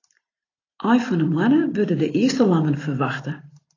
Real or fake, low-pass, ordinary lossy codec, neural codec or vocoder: real; 7.2 kHz; AAC, 32 kbps; none